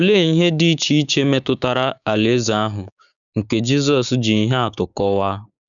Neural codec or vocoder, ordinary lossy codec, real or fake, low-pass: codec, 16 kHz, 6 kbps, DAC; none; fake; 7.2 kHz